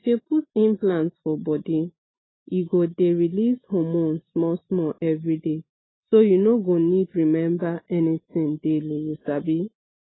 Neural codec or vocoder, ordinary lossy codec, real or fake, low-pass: none; AAC, 16 kbps; real; 7.2 kHz